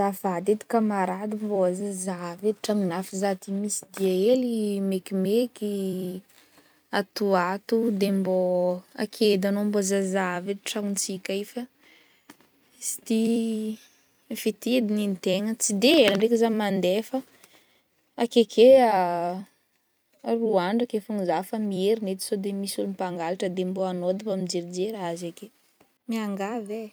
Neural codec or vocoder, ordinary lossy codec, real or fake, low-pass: vocoder, 44.1 kHz, 128 mel bands every 256 samples, BigVGAN v2; none; fake; none